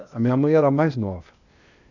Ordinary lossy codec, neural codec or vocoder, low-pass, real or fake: none; codec, 16 kHz, 0.8 kbps, ZipCodec; 7.2 kHz; fake